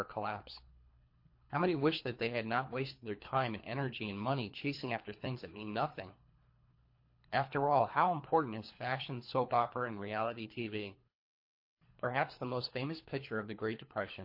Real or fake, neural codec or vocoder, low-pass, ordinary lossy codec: fake; codec, 24 kHz, 3 kbps, HILCodec; 5.4 kHz; MP3, 32 kbps